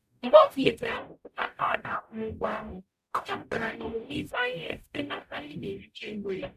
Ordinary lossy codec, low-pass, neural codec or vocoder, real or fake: MP3, 96 kbps; 14.4 kHz; codec, 44.1 kHz, 0.9 kbps, DAC; fake